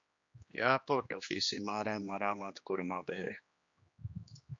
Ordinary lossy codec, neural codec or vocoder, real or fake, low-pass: MP3, 48 kbps; codec, 16 kHz, 2 kbps, X-Codec, HuBERT features, trained on general audio; fake; 7.2 kHz